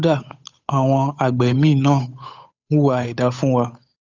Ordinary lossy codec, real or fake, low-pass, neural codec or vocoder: none; fake; 7.2 kHz; codec, 16 kHz, 8 kbps, FunCodec, trained on Chinese and English, 25 frames a second